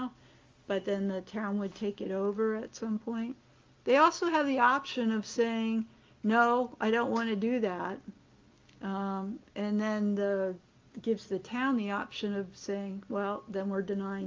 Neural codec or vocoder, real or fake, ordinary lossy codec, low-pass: none; real; Opus, 32 kbps; 7.2 kHz